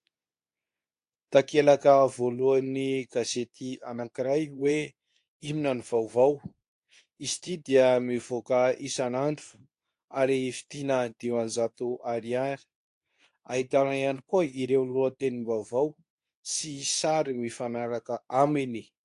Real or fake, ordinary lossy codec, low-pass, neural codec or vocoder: fake; AAC, 64 kbps; 10.8 kHz; codec, 24 kHz, 0.9 kbps, WavTokenizer, medium speech release version 2